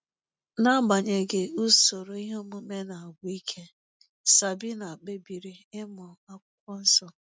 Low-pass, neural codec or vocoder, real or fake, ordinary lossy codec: none; none; real; none